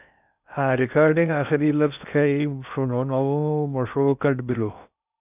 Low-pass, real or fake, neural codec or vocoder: 3.6 kHz; fake; codec, 16 kHz in and 24 kHz out, 0.6 kbps, FocalCodec, streaming, 2048 codes